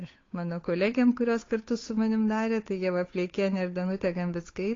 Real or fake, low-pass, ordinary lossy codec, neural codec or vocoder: fake; 7.2 kHz; AAC, 32 kbps; codec, 16 kHz, 8 kbps, FunCodec, trained on Chinese and English, 25 frames a second